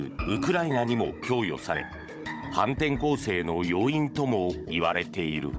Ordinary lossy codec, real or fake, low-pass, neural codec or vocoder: none; fake; none; codec, 16 kHz, 16 kbps, FunCodec, trained on Chinese and English, 50 frames a second